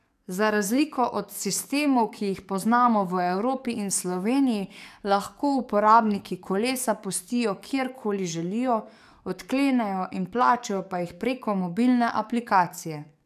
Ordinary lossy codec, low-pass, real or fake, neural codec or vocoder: none; 14.4 kHz; fake; codec, 44.1 kHz, 7.8 kbps, DAC